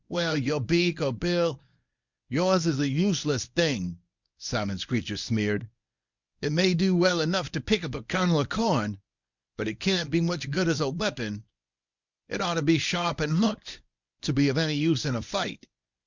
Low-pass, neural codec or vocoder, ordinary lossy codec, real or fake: 7.2 kHz; codec, 24 kHz, 0.9 kbps, WavTokenizer, medium speech release version 1; Opus, 64 kbps; fake